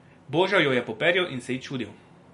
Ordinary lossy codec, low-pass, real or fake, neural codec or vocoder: MP3, 48 kbps; 10.8 kHz; real; none